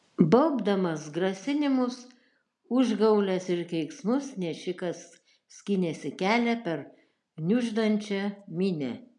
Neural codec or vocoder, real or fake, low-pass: none; real; 10.8 kHz